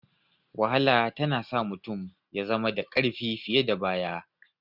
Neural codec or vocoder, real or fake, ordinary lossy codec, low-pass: none; real; none; 5.4 kHz